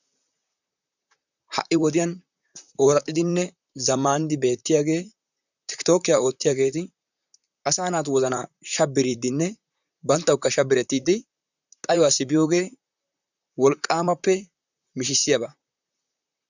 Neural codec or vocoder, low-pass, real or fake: vocoder, 44.1 kHz, 128 mel bands, Pupu-Vocoder; 7.2 kHz; fake